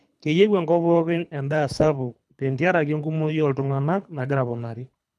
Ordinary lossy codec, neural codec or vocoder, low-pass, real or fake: none; codec, 24 kHz, 3 kbps, HILCodec; none; fake